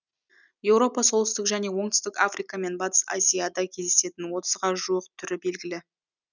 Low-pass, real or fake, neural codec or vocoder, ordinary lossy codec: 7.2 kHz; real; none; none